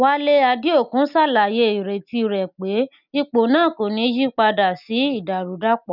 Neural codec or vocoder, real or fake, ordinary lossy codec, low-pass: none; real; none; 5.4 kHz